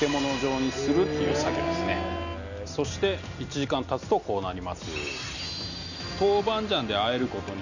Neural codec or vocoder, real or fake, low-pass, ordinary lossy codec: none; real; 7.2 kHz; none